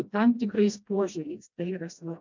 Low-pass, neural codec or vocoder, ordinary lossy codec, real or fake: 7.2 kHz; codec, 16 kHz, 1 kbps, FreqCodec, smaller model; MP3, 64 kbps; fake